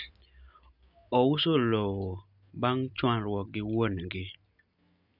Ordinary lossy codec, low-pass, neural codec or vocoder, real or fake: none; 5.4 kHz; none; real